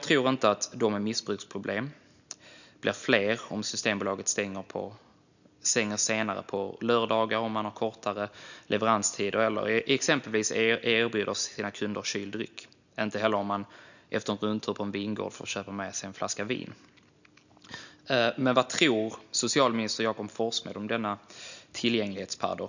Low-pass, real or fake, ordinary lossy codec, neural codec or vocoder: 7.2 kHz; real; none; none